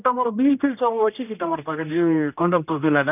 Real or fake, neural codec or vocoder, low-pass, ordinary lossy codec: fake; codec, 16 kHz, 1 kbps, X-Codec, HuBERT features, trained on general audio; 3.6 kHz; none